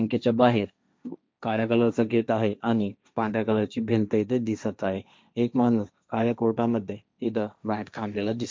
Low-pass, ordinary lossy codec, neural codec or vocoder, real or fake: none; none; codec, 16 kHz, 1.1 kbps, Voila-Tokenizer; fake